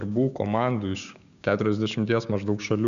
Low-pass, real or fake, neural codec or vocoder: 7.2 kHz; fake; codec, 16 kHz, 6 kbps, DAC